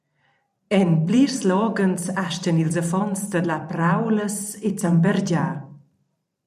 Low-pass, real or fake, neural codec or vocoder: 14.4 kHz; fake; vocoder, 44.1 kHz, 128 mel bands every 512 samples, BigVGAN v2